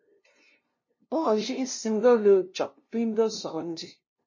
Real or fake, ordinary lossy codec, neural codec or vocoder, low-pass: fake; MP3, 32 kbps; codec, 16 kHz, 0.5 kbps, FunCodec, trained on LibriTTS, 25 frames a second; 7.2 kHz